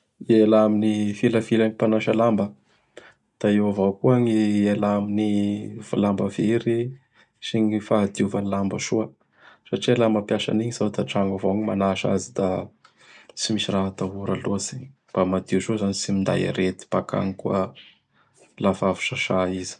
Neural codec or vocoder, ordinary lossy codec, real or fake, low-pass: none; none; real; 10.8 kHz